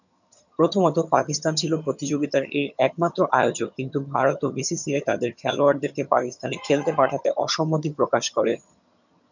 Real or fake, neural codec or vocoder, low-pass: fake; vocoder, 22.05 kHz, 80 mel bands, HiFi-GAN; 7.2 kHz